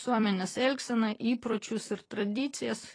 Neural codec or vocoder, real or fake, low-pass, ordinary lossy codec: vocoder, 44.1 kHz, 128 mel bands every 256 samples, BigVGAN v2; fake; 9.9 kHz; AAC, 32 kbps